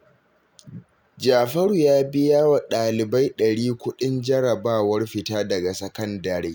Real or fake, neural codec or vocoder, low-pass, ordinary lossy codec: real; none; none; none